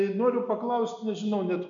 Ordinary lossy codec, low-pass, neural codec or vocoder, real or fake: MP3, 96 kbps; 7.2 kHz; none; real